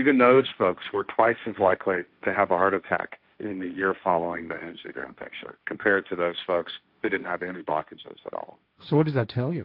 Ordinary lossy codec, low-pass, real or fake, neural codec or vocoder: AAC, 48 kbps; 5.4 kHz; fake; codec, 16 kHz, 1.1 kbps, Voila-Tokenizer